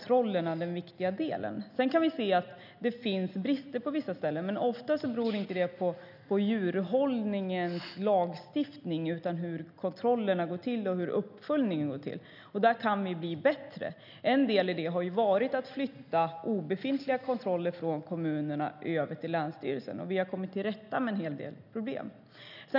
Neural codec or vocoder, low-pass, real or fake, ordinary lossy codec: none; 5.4 kHz; real; none